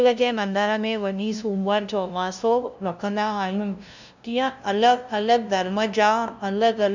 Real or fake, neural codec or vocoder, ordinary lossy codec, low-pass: fake; codec, 16 kHz, 0.5 kbps, FunCodec, trained on LibriTTS, 25 frames a second; none; 7.2 kHz